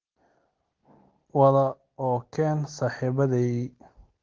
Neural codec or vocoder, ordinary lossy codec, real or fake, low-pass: none; Opus, 16 kbps; real; 7.2 kHz